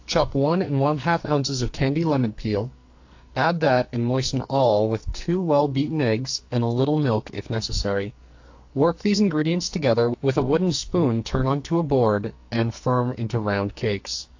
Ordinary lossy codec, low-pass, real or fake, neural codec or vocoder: AAC, 48 kbps; 7.2 kHz; fake; codec, 44.1 kHz, 2.6 kbps, SNAC